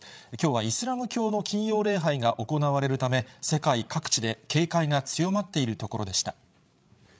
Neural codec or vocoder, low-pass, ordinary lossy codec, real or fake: codec, 16 kHz, 8 kbps, FreqCodec, larger model; none; none; fake